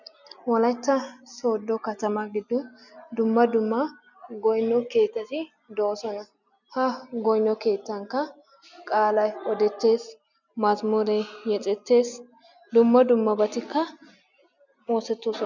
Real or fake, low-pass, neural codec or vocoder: real; 7.2 kHz; none